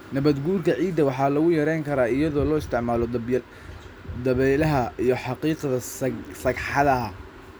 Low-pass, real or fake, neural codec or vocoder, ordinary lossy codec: none; real; none; none